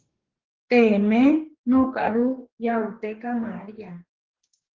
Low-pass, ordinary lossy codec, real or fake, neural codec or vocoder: 7.2 kHz; Opus, 16 kbps; fake; codec, 44.1 kHz, 2.6 kbps, DAC